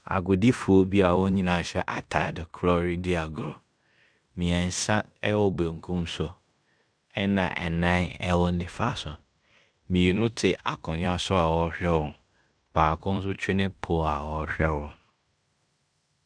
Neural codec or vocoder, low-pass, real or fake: codec, 16 kHz in and 24 kHz out, 0.9 kbps, LongCat-Audio-Codec, fine tuned four codebook decoder; 9.9 kHz; fake